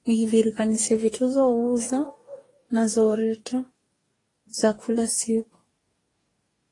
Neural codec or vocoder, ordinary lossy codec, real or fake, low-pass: codec, 44.1 kHz, 2.6 kbps, DAC; AAC, 32 kbps; fake; 10.8 kHz